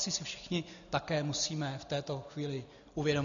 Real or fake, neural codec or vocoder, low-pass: real; none; 7.2 kHz